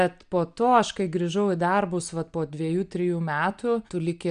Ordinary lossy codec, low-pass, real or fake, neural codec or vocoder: MP3, 96 kbps; 9.9 kHz; real; none